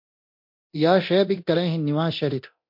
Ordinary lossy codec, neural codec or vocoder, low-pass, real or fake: MP3, 32 kbps; codec, 24 kHz, 0.9 kbps, DualCodec; 5.4 kHz; fake